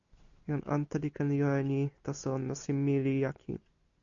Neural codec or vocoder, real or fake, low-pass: none; real; 7.2 kHz